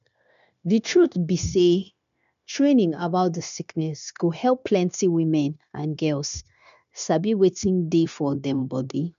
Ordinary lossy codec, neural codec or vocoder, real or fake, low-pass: MP3, 96 kbps; codec, 16 kHz, 0.9 kbps, LongCat-Audio-Codec; fake; 7.2 kHz